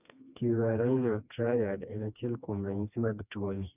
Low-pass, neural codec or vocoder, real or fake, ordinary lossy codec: 3.6 kHz; codec, 16 kHz, 2 kbps, FreqCodec, smaller model; fake; none